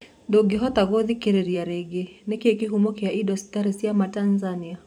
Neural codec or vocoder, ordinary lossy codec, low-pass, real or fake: vocoder, 44.1 kHz, 128 mel bands every 512 samples, BigVGAN v2; none; 19.8 kHz; fake